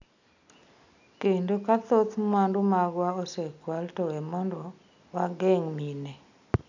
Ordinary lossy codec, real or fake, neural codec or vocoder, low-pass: none; real; none; 7.2 kHz